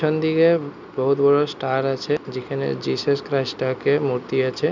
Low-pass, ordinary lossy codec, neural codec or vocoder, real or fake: 7.2 kHz; none; none; real